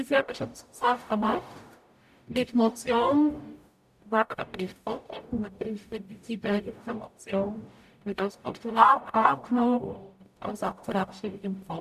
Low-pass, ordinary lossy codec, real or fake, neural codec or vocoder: 14.4 kHz; none; fake; codec, 44.1 kHz, 0.9 kbps, DAC